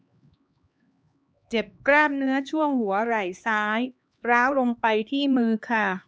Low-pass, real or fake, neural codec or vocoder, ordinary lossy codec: none; fake; codec, 16 kHz, 2 kbps, X-Codec, HuBERT features, trained on LibriSpeech; none